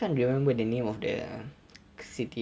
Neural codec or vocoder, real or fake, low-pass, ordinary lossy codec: none; real; none; none